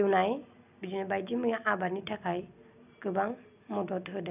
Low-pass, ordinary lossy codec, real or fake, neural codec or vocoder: 3.6 kHz; none; real; none